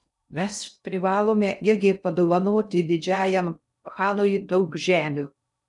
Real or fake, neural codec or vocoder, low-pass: fake; codec, 16 kHz in and 24 kHz out, 0.6 kbps, FocalCodec, streaming, 2048 codes; 10.8 kHz